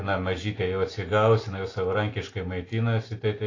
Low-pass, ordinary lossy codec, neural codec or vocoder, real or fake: 7.2 kHz; AAC, 32 kbps; none; real